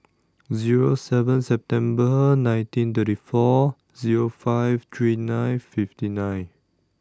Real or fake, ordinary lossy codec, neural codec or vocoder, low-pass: real; none; none; none